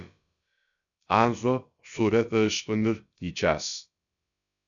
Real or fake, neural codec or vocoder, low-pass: fake; codec, 16 kHz, about 1 kbps, DyCAST, with the encoder's durations; 7.2 kHz